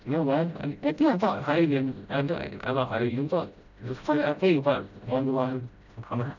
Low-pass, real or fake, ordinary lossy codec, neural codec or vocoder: 7.2 kHz; fake; none; codec, 16 kHz, 0.5 kbps, FreqCodec, smaller model